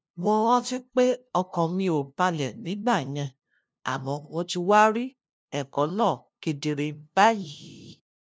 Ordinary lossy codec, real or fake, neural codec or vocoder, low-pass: none; fake; codec, 16 kHz, 0.5 kbps, FunCodec, trained on LibriTTS, 25 frames a second; none